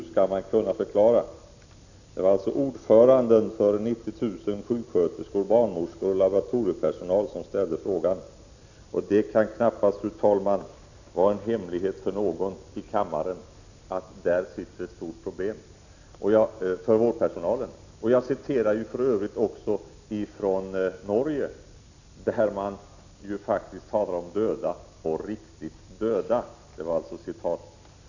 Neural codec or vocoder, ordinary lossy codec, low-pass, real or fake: none; none; 7.2 kHz; real